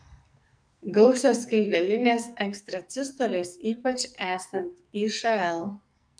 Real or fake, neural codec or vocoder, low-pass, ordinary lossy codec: fake; codec, 44.1 kHz, 2.6 kbps, SNAC; 9.9 kHz; MP3, 96 kbps